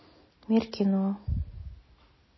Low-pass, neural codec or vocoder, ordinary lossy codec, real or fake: 7.2 kHz; none; MP3, 24 kbps; real